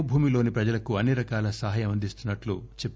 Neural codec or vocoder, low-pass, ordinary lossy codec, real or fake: none; none; none; real